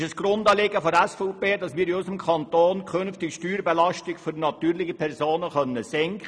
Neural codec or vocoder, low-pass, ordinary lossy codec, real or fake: none; none; none; real